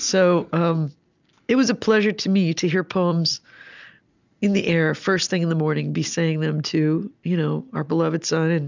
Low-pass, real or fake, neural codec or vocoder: 7.2 kHz; real; none